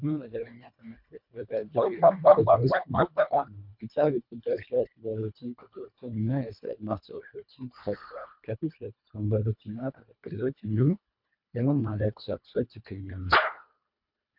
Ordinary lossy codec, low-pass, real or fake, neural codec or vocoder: MP3, 48 kbps; 5.4 kHz; fake; codec, 24 kHz, 1.5 kbps, HILCodec